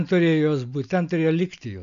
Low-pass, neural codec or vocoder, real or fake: 7.2 kHz; none; real